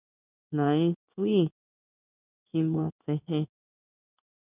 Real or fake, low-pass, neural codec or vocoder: fake; 3.6 kHz; codec, 16 kHz in and 24 kHz out, 1 kbps, XY-Tokenizer